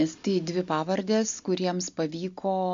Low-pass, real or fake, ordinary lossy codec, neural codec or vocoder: 7.2 kHz; real; MP3, 64 kbps; none